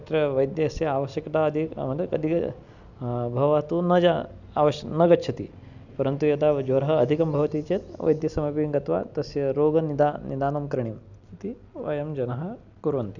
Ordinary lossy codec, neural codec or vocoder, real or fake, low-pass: none; none; real; 7.2 kHz